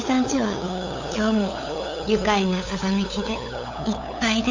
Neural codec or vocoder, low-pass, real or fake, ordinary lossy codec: codec, 16 kHz, 8 kbps, FunCodec, trained on LibriTTS, 25 frames a second; 7.2 kHz; fake; AAC, 48 kbps